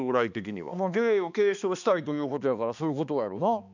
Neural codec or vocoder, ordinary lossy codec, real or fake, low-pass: codec, 16 kHz, 2 kbps, X-Codec, HuBERT features, trained on balanced general audio; none; fake; 7.2 kHz